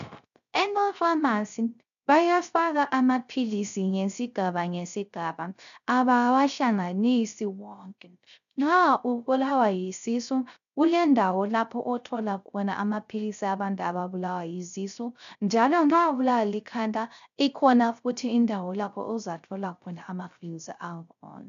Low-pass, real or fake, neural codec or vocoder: 7.2 kHz; fake; codec, 16 kHz, 0.3 kbps, FocalCodec